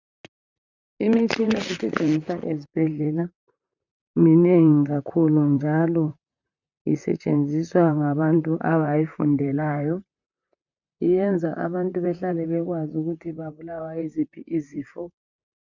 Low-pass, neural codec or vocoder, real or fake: 7.2 kHz; vocoder, 44.1 kHz, 128 mel bands, Pupu-Vocoder; fake